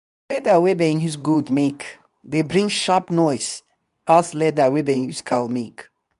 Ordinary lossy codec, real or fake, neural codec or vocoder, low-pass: none; fake; codec, 24 kHz, 0.9 kbps, WavTokenizer, medium speech release version 2; 10.8 kHz